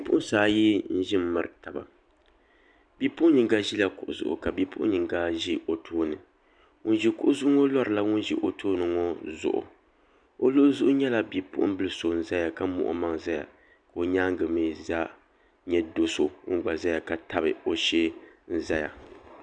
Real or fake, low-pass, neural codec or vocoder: real; 9.9 kHz; none